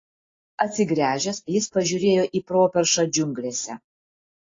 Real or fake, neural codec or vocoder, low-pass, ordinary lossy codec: real; none; 7.2 kHz; AAC, 32 kbps